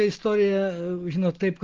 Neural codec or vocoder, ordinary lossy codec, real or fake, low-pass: none; Opus, 16 kbps; real; 7.2 kHz